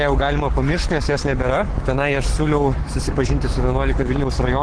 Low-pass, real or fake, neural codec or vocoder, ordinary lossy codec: 9.9 kHz; fake; codec, 44.1 kHz, 7.8 kbps, Pupu-Codec; Opus, 16 kbps